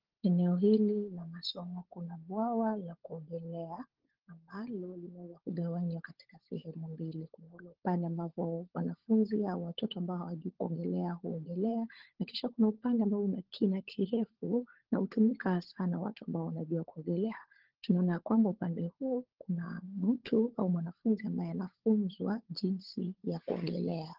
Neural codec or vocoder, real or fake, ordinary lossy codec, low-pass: codec, 16 kHz, 16 kbps, FunCodec, trained on LibriTTS, 50 frames a second; fake; Opus, 16 kbps; 5.4 kHz